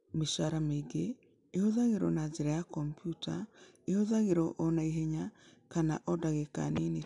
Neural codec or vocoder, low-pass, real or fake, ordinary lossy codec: none; 10.8 kHz; real; none